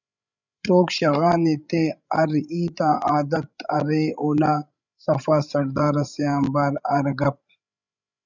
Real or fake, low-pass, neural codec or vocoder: fake; 7.2 kHz; codec, 16 kHz, 16 kbps, FreqCodec, larger model